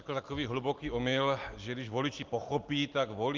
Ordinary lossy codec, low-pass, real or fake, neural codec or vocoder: Opus, 32 kbps; 7.2 kHz; real; none